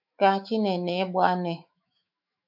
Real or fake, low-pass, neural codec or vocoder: fake; 5.4 kHz; autoencoder, 48 kHz, 128 numbers a frame, DAC-VAE, trained on Japanese speech